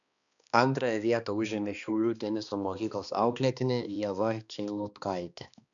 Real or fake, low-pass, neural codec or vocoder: fake; 7.2 kHz; codec, 16 kHz, 2 kbps, X-Codec, HuBERT features, trained on balanced general audio